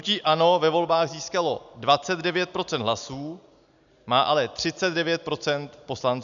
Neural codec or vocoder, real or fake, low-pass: none; real; 7.2 kHz